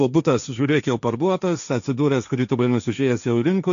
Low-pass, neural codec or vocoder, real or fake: 7.2 kHz; codec, 16 kHz, 1.1 kbps, Voila-Tokenizer; fake